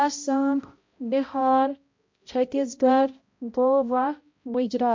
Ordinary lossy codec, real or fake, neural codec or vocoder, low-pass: MP3, 48 kbps; fake; codec, 16 kHz, 0.5 kbps, X-Codec, HuBERT features, trained on balanced general audio; 7.2 kHz